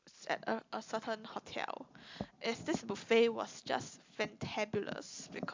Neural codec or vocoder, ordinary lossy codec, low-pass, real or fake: none; MP3, 64 kbps; 7.2 kHz; real